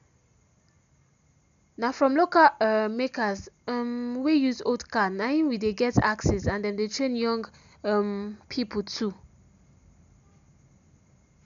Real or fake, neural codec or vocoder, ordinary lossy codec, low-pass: real; none; none; 7.2 kHz